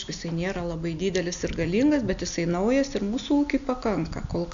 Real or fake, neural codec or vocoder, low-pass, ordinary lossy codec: real; none; 7.2 kHz; AAC, 96 kbps